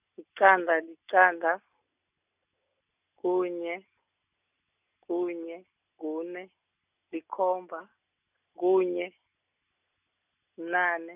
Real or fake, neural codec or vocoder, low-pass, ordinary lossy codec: real; none; 3.6 kHz; none